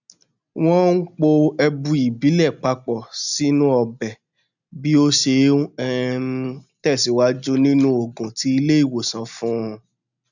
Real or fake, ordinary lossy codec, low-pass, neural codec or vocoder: real; none; 7.2 kHz; none